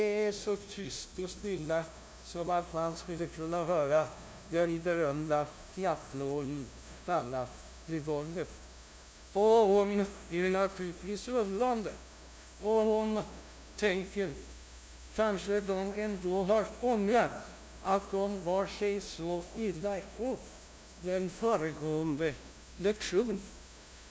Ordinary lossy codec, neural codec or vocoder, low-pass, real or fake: none; codec, 16 kHz, 0.5 kbps, FunCodec, trained on LibriTTS, 25 frames a second; none; fake